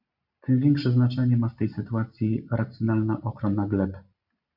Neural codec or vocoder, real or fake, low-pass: none; real; 5.4 kHz